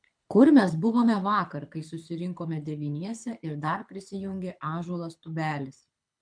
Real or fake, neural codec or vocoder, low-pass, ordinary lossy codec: fake; codec, 24 kHz, 6 kbps, HILCodec; 9.9 kHz; MP3, 64 kbps